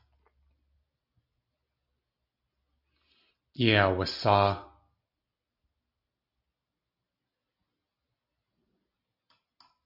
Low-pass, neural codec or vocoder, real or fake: 5.4 kHz; none; real